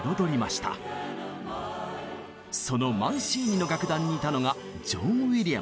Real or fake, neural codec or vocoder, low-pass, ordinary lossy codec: real; none; none; none